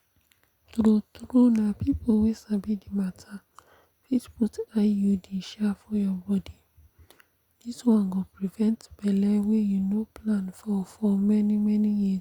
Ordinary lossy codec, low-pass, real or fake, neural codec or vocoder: Opus, 64 kbps; 19.8 kHz; real; none